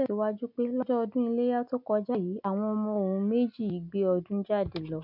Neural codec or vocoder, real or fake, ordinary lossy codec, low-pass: none; real; none; 5.4 kHz